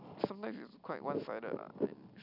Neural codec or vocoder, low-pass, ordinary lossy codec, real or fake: none; 5.4 kHz; none; real